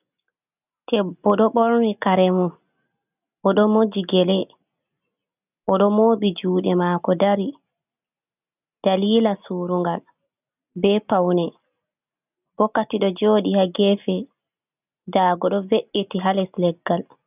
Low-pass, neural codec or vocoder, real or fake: 3.6 kHz; none; real